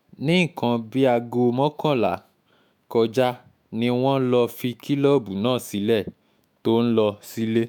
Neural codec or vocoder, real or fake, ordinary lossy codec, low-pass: autoencoder, 48 kHz, 128 numbers a frame, DAC-VAE, trained on Japanese speech; fake; none; none